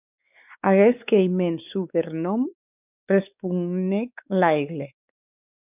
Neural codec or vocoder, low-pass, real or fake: codec, 16 kHz, 4 kbps, X-Codec, WavLM features, trained on Multilingual LibriSpeech; 3.6 kHz; fake